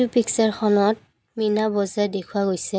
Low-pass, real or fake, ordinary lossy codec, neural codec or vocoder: none; real; none; none